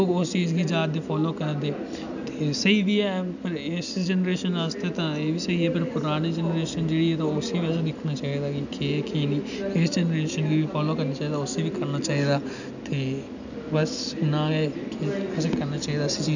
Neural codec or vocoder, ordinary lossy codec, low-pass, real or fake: none; none; 7.2 kHz; real